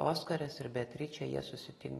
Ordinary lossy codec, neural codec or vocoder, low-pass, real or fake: AAC, 32 kbps; none; 19.8 kHz; real